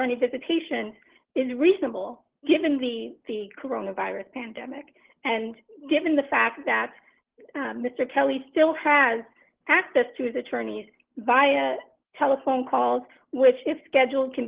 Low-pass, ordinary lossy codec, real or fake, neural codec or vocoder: 3.6 kHz; Opus, 16 kbps; real; none